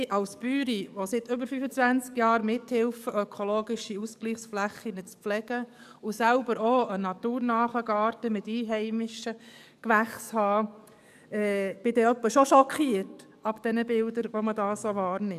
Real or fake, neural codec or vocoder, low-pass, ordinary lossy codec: fake; codec, 44.1 kHz, 7.8 kbps, DAC; 14.4 kHz; none